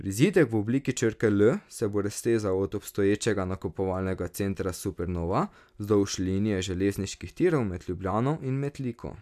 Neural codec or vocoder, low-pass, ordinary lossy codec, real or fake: none; 14.4 kHz; none; real